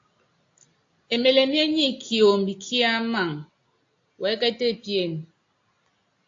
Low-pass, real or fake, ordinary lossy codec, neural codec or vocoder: 7.2 kHz; real; AAC, 48 kbps; none